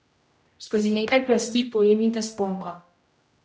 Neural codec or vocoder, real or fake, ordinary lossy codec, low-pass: codec, 16 kHz, 0.5 kbps, X-Codec, HuBERT features, trained on general audio; fake; none; none